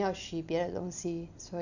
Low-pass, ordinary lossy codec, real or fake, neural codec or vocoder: 7.2 kHz; none; real; none